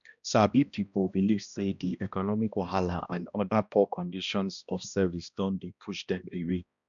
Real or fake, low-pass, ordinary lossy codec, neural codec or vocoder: fake; 7.2 kHz; none; codec, 16 kHz, 1 kbps, X-Codec, HuBERT features, trained on balanced general audio